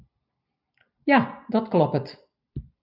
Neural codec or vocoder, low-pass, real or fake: none; 5.4 kHz; real